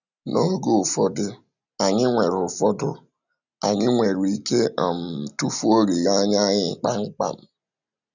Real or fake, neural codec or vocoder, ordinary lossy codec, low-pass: real; none; none; 7.2 kHz